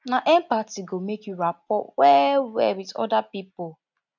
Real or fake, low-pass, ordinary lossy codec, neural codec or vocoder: real; 7.2 kHz; none; none